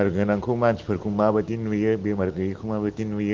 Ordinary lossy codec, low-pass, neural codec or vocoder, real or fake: Opus, 16 kbps; 7.2 kHz; none; real